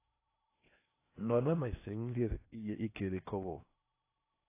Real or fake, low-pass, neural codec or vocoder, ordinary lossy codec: fake; 3.6 kHz; codec, 16 kHz in and 24 kHz out, 0.8 kbps, FocalCodec, streaming, 65536 codes; AAC, 24 kbps